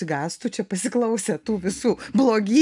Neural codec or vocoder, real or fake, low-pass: none; real; 10.8 kHz